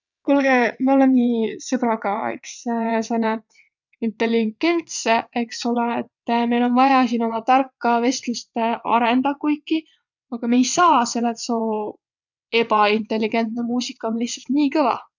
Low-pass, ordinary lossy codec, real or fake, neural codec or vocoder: 7.2 kHz; none; fake; vocoder, 22.05 kHz, 80 mel bands, WaveNeXt